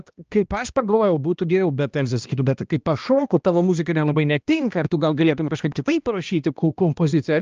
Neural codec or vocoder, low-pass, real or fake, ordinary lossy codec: codec, 16 kHz, 1 kbps, X-Codec, HuBERT features, trained on balanced general audio; 7.2 kHz; fake; Opus, 24 kbps